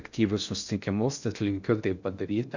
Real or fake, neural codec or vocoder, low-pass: fake; codec, 16 kHz in and 24 kHz out, 0.6 kbps, FocalCodec, streaming, 4096 codes; 7.2 kHz